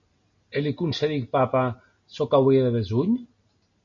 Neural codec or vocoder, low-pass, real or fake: none; 7.2 kHz; real